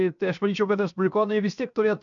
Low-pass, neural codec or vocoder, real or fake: 7.2 kHz; codec, 16 kHz, 0.7 kbps, FocalCodec; fake